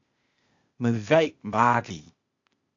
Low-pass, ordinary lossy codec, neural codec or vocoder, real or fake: 7.2 kHz; AAC, 48 kbps; codec, 16 kHz, 0.8 kbps, ZipCodec; fake